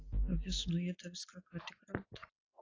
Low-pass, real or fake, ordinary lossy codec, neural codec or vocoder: 7.2 kHz; real; AAC, 32 kbps; none